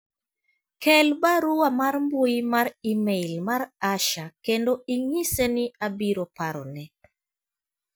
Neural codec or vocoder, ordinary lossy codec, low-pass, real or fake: none; none; none; real